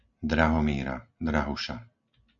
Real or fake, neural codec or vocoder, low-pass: real; none; 7.2 kHz